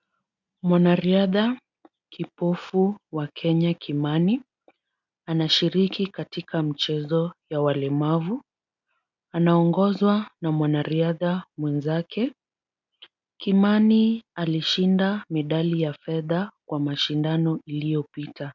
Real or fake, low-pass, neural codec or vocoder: real; 7.2 kHz; none